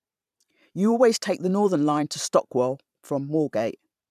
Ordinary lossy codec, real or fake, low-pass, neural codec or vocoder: none; fake; 14.4 kHz; vocoder, 44.1 kHz, 128 mel bands every 512 samples, BigVGAN v2